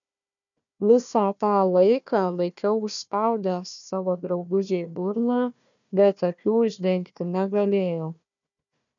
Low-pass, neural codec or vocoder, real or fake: 7.2 kHz; codec, 16 kHz, 1 kbps, FunCodec, trained on Chinese and English, 50 frames a second; fake